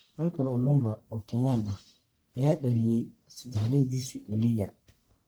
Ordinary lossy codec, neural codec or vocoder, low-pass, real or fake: none; codec, 44.1 kHz, 1.7 kbps, Pupu-Codec; none; fake